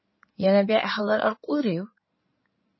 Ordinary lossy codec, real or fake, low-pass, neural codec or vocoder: MP3, 24 kbps; fake; 7.2 kHz; codec, 16 kHz in and 24 kHz out, 2.2 kbps, FireRedTTS-2 codec